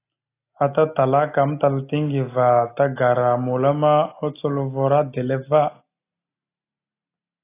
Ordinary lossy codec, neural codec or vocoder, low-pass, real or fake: AAC, 24 kbps; none; 3.6 kHz; real